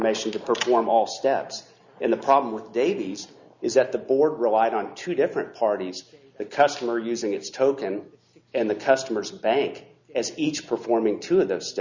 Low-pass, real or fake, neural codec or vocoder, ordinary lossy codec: 7.2 kHz; real; none; Opus, 64 kbps